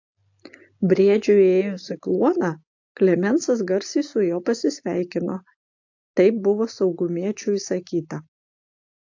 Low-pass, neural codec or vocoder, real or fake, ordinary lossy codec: 7.2 kHz; none; real; AAC, 48 kbps